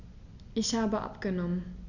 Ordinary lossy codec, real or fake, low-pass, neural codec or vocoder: none; real; 7.2 kHz; none